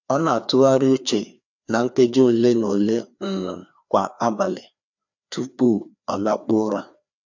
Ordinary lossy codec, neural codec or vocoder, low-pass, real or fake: none; codec, 16 kHz, 2 kbps, FreqCodec, larger model; 7.2 kHz; fake